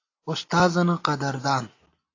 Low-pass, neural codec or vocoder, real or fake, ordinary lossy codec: 7.2 kHz; none; real; AAC, 32 kbps